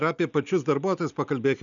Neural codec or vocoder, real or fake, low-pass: none; real; 7.2 kHz